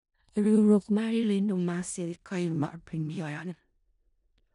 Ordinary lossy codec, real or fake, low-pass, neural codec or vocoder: none; fake; 10.8 kHz; codec, 16 kHz in and 24 kHz out, 0.4 kbps, LongCat-Audio-Codec, four codebook decoder